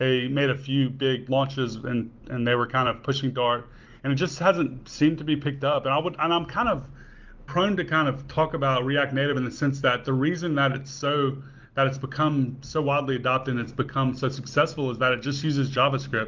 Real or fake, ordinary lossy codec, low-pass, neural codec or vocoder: real; Opus, 32 kbps; 7.2 kHz; none